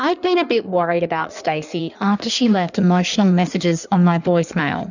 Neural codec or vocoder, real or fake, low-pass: codec, 16 kHz in and 24 kHz out, 1.1 kbps, FireRedTTS-2 codec; fake; 7.2 kHz